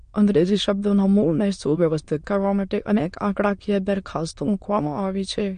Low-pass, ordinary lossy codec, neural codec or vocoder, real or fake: 9.9 kHz; MP3, 48 kbps; autoencoder, 22.05 kHz, a latent of 192 numbers a frame, VITS, trained on many speakers; fake